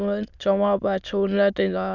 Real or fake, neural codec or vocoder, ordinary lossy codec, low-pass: fake; autoencoder, 22.05 kHz, a latent of 192 numbers a frame, VITS, trained on many speakers; none; 7.2 kHz